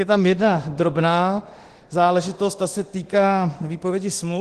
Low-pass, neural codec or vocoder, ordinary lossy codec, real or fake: 10.8 kHz; codec, 24 kHz, 0.9 kbps, DualCodec; Opus, 16 kbps; fake